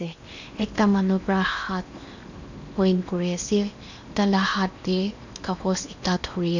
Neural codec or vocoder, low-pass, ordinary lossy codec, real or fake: codec, 16 kHz in and 24 kHz out, 0.8 kbps, FocalCodec, streaming, 65536 codes; 7.2 kHz; none; fake